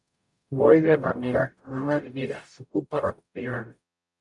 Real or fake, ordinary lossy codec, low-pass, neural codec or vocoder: fake; MP3, 96 kbps; 10.8 kHz; codec, 44.1 kHz, 0.9 kbps, DAC